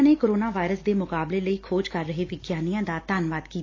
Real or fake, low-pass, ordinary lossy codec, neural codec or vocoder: real; 7.2 kHz; AAC, 32 kbps; none